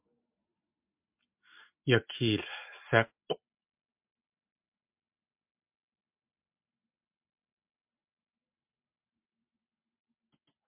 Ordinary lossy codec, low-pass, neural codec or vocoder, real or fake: MP3, 32 kbps; 3.6 kHz; none; real